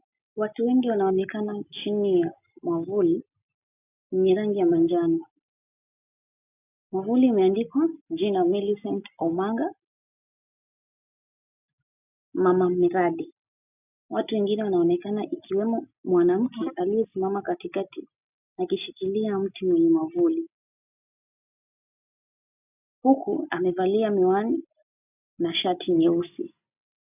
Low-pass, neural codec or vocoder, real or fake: 3.6 kHz; none; real